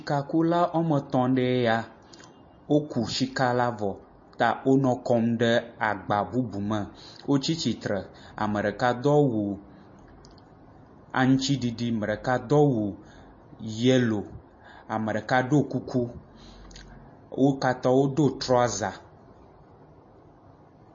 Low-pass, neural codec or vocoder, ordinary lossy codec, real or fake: 7.2 kHz; none; MP3, 32 kbps; real